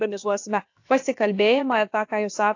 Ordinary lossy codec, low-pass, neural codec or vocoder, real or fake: AAC, 48 kbps; 7.2 kHz; codec, 16 kHz, about 1 kbps, DyCAST, with the encoder's durations; fake